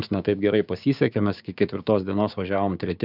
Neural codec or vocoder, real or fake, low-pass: codec, 16 kHz, 6 kbps, DAC; fake; 5.4 kHz